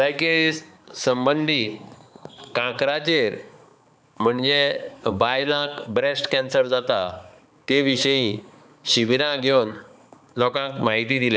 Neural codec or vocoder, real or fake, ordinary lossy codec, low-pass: codec, 16 kHz, 4 kbps, X-Codec, HuBERT features, trained on balanced general audio; fake; none; none